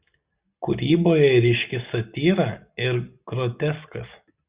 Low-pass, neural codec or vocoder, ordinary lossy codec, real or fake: 3.6 kHz; none; Opus, 64 kbps; real